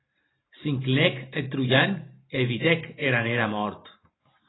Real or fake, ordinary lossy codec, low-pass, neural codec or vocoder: fake; AAC, 16 kbps; 7.2 kHz; vocoder, 44.1 kHz, 128 mel bands every 512 samples, BigVGAN v2